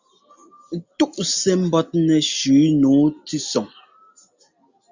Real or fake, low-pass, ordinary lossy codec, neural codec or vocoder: real; 7.2 kHz; Opus, 64 kbps; none